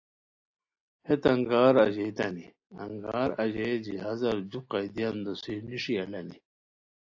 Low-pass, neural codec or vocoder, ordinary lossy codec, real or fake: 7.2 kHz; none; AAC, 48 kbps; real